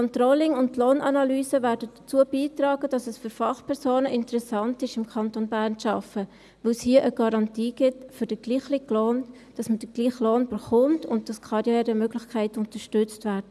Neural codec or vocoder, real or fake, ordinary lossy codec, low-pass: none; real; none; none